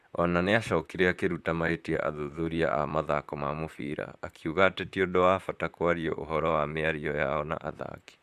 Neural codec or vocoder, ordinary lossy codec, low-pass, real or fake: vocoder, 44.1 kHz, 128 mel bands, Pupu-Vocoder; Opus, 64 kbps; 14.4 kHz; fake